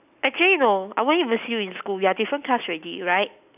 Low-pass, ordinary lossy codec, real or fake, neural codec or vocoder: 3.6 kHz; none; real; none